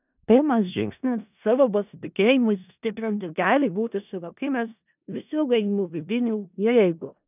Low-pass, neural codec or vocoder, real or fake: 3.6 kHz; codec, 16 kHz in and 24 kHz out, 0.4 kbps, LongCat-Audio-Codec, four codebook decoder; fake